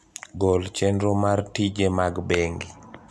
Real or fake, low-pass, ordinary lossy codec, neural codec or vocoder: real; none; none; none